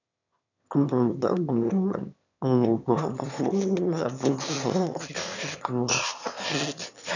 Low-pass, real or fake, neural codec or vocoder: 7.2 kHz; fake; autoencoder, 22.05 kHz, a latent of 192 numbers a frame, VITS, trained on one speaker